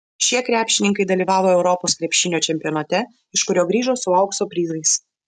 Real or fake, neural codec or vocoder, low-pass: real; none; 10.8 kHz